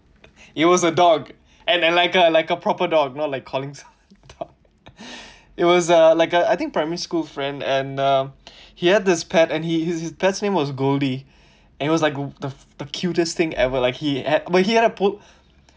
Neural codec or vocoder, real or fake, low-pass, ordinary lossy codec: none; real; none; none